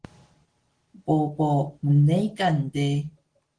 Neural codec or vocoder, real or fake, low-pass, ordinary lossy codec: none; real; 9.9 kHz; Opus, 16 kbps